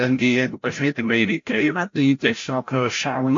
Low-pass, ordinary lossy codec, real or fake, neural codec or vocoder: 7.2 kHz; AAC, 48 kbps; fake; codec, 16 kHz, 0.5 kbps, FreqCodec, larger model